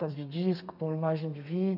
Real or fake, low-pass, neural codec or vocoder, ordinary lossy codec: fake; 5.4 kHz; codec, 32 kHz, 1.9 kbps, SNAC; none